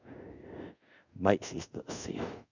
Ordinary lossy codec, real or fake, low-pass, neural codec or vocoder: none; fake; 7.2 kHz; codec, 24 kHz, 0.5 kbps, DualCodec